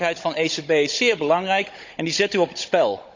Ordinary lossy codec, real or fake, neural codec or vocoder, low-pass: MP3, 64 kbps; fake; codec, 16 kHz, 16 kbps, FunCodec, trained on Chinese and English, 50 frames a second; 7.2 kHz